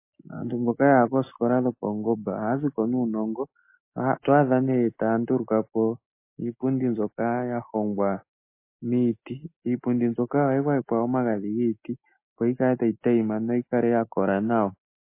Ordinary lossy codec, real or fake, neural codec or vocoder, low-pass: MP3, 24 kbps; real; none; 3.6 kHz